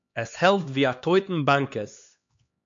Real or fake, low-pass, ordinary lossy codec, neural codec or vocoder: fake; 7.2 kHz; MP3, 48 kbps; codec, 16 kHz, 2 kbps, X-Codec, HuBERT features, trained on LibriSpeech